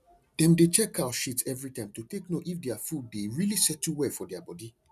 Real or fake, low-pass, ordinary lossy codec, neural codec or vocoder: real; 14.4 kHz; none; none